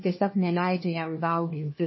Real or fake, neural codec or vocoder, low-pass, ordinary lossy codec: fake; codec, 16 kHz, 1 kbps, FunCodec, trained on LibriTTS, 50 frames a second; 7.2 kHz; MP3, 24 kbps